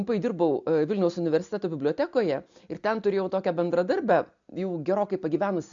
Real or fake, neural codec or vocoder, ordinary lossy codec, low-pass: real; none; MP3, 64 kbps; 7.2 kHz